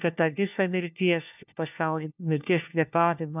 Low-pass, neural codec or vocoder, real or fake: 3.6 kHz; codec, 16 kHz, 1 kbps, FunCodec, trained on LibriTTS, 50 frames a second; fake